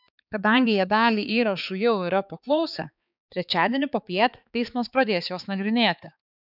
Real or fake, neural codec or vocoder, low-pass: fake; codec, 16 kHz, 4 kbps, X-Codec, HuBERT features, trained on balanced general audio; 5.4 kHz